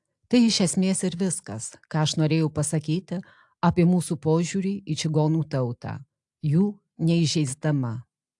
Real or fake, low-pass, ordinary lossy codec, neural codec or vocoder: real; 10.8 kHz; AAC, 64 kbps; none